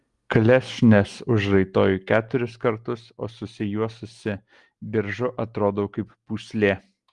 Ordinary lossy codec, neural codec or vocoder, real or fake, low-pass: Opus, 24 kbps; none; real; 10.8 kHz